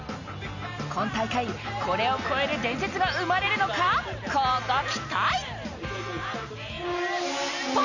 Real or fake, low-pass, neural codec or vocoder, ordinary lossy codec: real; 7.2 kHz; none; none